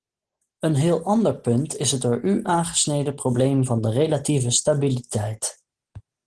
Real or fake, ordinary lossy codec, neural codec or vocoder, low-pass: real; Opus, 16 kbps; none; 10.8 kHz